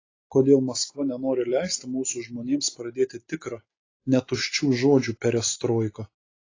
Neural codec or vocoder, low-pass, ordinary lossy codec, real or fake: none; 7.2 kHz; AAC, 32 kbps; real